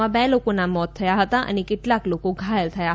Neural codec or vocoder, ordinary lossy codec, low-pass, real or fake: none; none; none; real